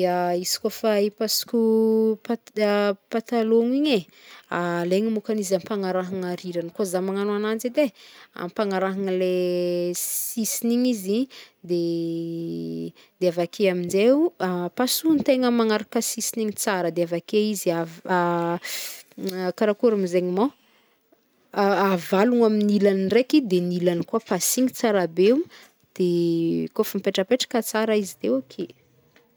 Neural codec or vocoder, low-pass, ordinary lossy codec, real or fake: none; none; none; real